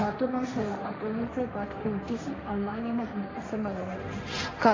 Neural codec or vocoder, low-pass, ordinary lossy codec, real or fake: codec, 16 kHz, 1.1 kbps, Voila-Tokenizer; 7.2 kHz; AAC, 32 kbps; fake